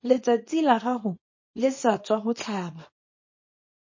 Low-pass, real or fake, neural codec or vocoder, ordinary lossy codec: 7.2 kHz; fake; codec, 24 kHz, 0.9 kbps, WavTokenizer, small release; MP3, 32 kbps